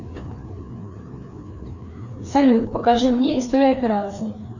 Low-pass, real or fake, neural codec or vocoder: 7.2 kHz; fake; codec, 16 kHz, 2 kbps, FreqCodec, larger model